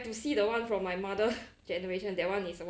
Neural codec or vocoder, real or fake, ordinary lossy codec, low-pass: none; real; none; none